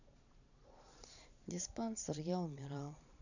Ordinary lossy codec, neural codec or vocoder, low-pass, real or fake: none; vocoder, 22.05 kHz, 80 mel bands, Vocos; 7.2 kHz; fake